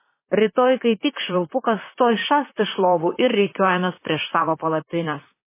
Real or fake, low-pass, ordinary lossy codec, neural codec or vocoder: fake; 3.6 kHz; MP3, 16 kbps; vocoder, 44.1 kHz, 128 mel bands, Pupu-Vocoder